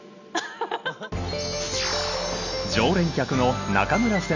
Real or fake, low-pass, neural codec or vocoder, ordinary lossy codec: real; 7.2 kHz; none; none